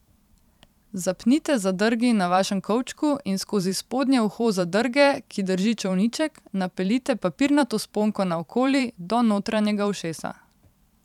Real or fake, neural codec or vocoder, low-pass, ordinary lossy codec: fake; vocoder, 44.1 kHz, 128 mel bands every 512 samples, BigVGAN v2; 19.8 kHz; none